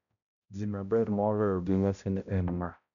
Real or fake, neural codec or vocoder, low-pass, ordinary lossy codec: fake; codec, 16 kHz, 0.5 kbps, X-Codec, HuBERT features, trained on balanced general audio; 7.2 kHz; none